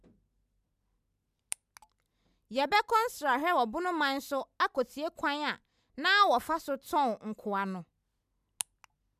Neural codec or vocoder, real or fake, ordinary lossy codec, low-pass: none; real; none; 14.4 kHz